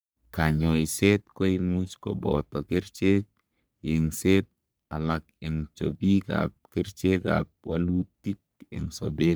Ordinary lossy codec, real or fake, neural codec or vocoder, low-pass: none; fake; codec, 44.1 kHz, 3.4 kbps, Pupu-Codec; none